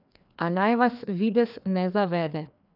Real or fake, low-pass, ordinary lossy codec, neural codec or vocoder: fake; 5.4 kHz; none; codec, 16 kHz, 2 kbps, FreqCodec, larger model